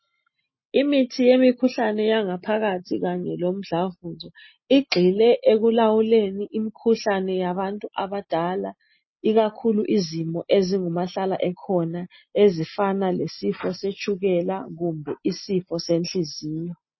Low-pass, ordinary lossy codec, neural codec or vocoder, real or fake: 7.2 kHz; MP3, 24 kbps; none; real